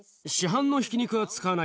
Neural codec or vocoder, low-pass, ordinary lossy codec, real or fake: none; none; none; real